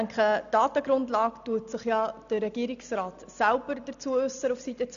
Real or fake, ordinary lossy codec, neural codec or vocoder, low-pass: real; none; none; 7.2 kHz